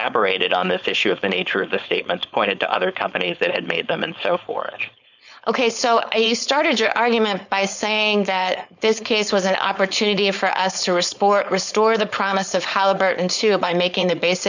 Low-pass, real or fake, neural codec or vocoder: 7.2 kHz; fake; codec, 16 kHz, 4.8 kbps, FACodec